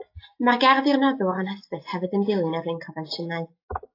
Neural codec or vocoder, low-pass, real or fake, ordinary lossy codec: none; 5.4 kHz; real; AAC, 32 kbps